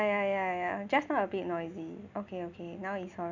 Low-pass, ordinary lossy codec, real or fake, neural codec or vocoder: 7.2 kHz; none; real; none